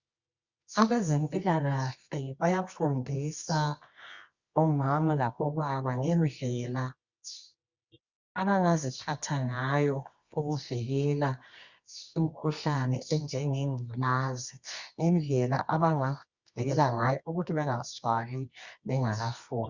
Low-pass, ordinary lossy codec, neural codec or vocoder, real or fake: 7.2 kHz; Opus, 64 kbps; codec, 24 kHz, 0.9 kbps, WavTokenizer, medium music audio release; fake